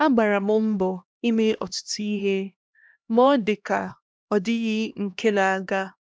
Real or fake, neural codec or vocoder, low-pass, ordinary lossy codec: fake; codec, 16 kHz, 1 kbps, X-Codec, HuBERT features, trained on LibriSpeech; none; none